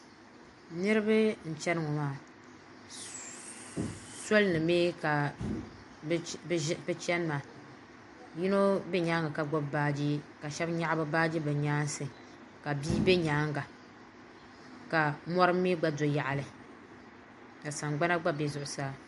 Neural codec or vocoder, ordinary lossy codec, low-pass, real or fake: none; MP3, 48 kbps; 14.4 kHz; real